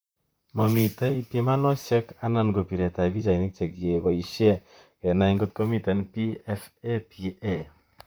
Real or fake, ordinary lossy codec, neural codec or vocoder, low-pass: fake; none; vocoder, 44.1 kHz, 128 mel bands, Pupu-Vocoder; none